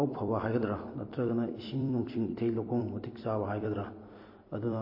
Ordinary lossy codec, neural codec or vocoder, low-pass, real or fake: MP3, 24 kbps; vocoder, 44.1 kHz, 128 mel bands every 256 samples, BigVGAN v2; 5.4 kHz; fake